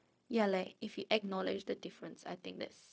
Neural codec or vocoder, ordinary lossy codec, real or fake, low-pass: codec, 16 kHz, 0.4 kbps, LongCat-Audio-Codec; none; fake; none